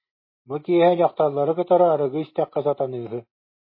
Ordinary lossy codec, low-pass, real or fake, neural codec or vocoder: MP3, 24 kbps; 5.4 kHz; real; none